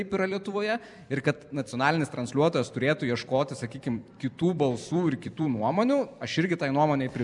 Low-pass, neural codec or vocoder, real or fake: 10.8 kHz; vocoder, 44.1 kHz, 128 mel bands every 256 samples, BigVGAN v2; fake